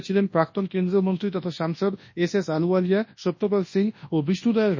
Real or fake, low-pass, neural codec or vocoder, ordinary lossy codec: fake; 7.2 kHz; codec, 24 kHz, 0.9 kbps, WavTokenizer, large speech release; MP3, 32 kbps